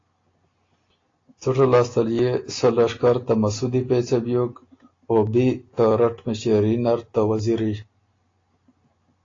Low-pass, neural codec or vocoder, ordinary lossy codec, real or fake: 7.2 kHz; none; AAC, 32 kbps; real